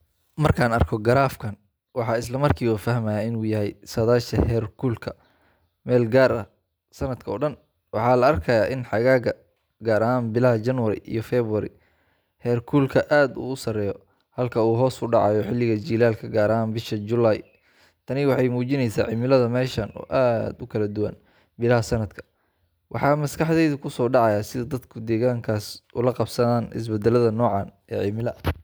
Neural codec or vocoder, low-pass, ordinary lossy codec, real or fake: none; none; none; real